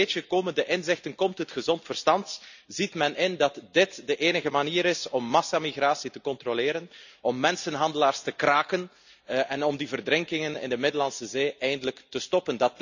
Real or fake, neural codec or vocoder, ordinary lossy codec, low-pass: real; none; none; 7.2 kHz